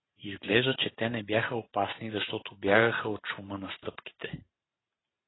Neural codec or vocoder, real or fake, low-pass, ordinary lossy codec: vocoder, 44.1 kHz, 128 mel bands every 512 samples, BigVGAN v2; fake; 7.2 kHz; AAC, 16 kbps